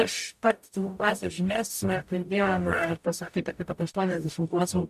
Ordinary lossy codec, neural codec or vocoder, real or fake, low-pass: MP3, 96 kbps; codec, 44.1 kHz, 0.9 kbps, DAC; fake; 14.4 kHz